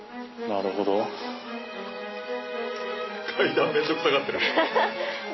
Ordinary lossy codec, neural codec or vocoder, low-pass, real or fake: MP3, 24 kbps; none; 7.2 kHz; real